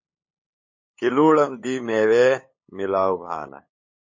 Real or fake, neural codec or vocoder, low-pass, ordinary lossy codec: fake; codec, 16 kHz, 8 kbps, FunCodec, trained on LibriTTS, 25 frames a second; 7.2 kHz; MP3, 32 kbps